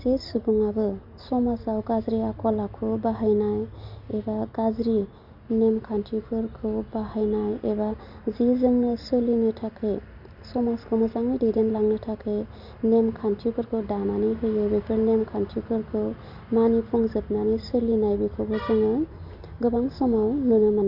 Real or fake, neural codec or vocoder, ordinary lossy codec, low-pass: real; none; Opus, 64 kbps; 5.4 kHz